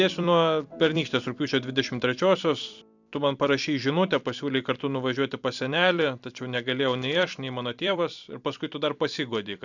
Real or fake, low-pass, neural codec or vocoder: fake; 7.2 kHz; vocoder, 44.1 kHz, 128 mel bands every 256 samples, BigVGAN v2